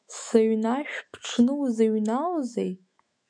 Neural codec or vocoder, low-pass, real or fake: autoencoder, 48 kHz, 128 numbers a frame, DAC-VAE, trained on Japanese speech; 9.9 kHz; fake